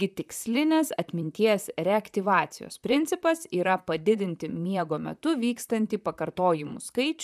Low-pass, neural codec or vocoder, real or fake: 14.4 kHz; vocoder, 44.1 kHz, 128 mel bands, Pupu-Vocoder; fake